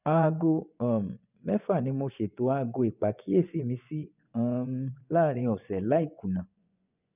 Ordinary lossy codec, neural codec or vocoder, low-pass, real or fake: none; vocoder, 24 kHz, 100 mel bands, Vocos; 3.6 kHz; fake